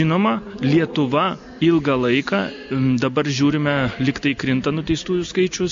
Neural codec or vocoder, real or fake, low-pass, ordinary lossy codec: none; real; 7.2 kHz; MP3, 48 kbps